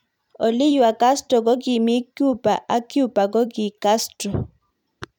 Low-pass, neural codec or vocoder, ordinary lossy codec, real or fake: 19.8 kHz; vocoder, 44.1 kHz, 128 mel bands every 512 samples, BigVGAN v2; none; fake